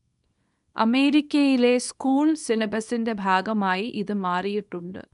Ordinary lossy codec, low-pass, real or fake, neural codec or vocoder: none; 10.8 kHz; fake; codec, 24 kHz, 0.9 kbps, WavTokenizer, small release